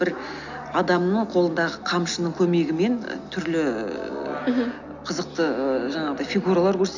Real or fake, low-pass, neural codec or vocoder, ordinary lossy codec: real; 7.2 kHz; none; none